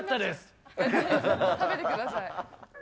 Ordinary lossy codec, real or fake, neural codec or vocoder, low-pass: none; real; none; none